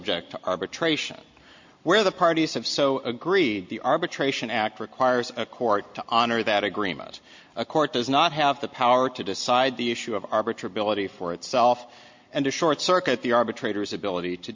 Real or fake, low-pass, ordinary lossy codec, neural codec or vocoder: real; 7.2 kHz; MP3, 48 kbps; none